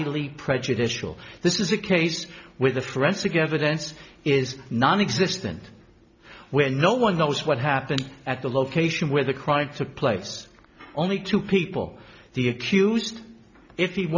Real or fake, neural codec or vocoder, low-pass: real; none; 7.2 kHz